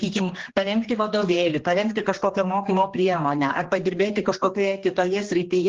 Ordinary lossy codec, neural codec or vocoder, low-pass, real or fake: Opus, 16 kbps; codec, 16 kHz, 2 kbps, X-Codec, HuBERT features, trained on general audio; 7.2 kHz; fake